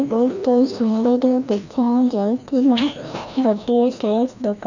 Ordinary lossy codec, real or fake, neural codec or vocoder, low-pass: none; fake; codec, 16 kHz, 1 kbps, FreqCodec, larger model; 7.2 kHz